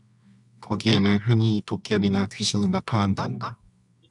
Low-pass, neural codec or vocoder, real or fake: 10.8 kHz; codec, 24 kHz, 0.9 kbps, WavTokenizer, medium music audio release; fake